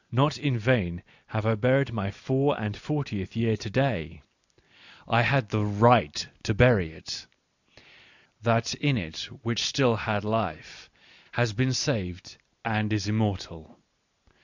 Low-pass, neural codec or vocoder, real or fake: 7.2 kHz; none; real